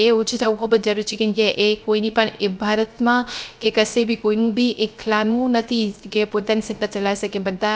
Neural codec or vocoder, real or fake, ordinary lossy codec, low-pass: codec, 16 kHz, 0.3 kbps, FocalCodec; fake; none; none